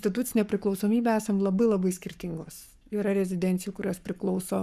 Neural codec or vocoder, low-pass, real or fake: codec, 44.1 kHz, 7.8 kbps, Pupu-Codec; 14.4 kHz; fake